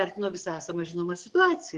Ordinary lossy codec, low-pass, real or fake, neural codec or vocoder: Opus, 16 kbps; 10.8 kHz; fake; codec, 44.1 kHz, 7.8 kbps, DAC